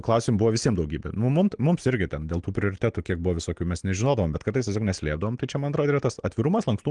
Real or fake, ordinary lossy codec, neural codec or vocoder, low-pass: real; Opus, 24 kbps; none; 9.9 kHz